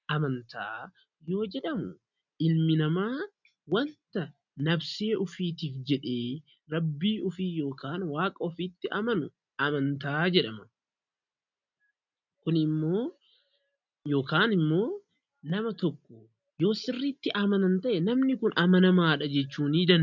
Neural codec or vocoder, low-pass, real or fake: none; 7.2 kHz; real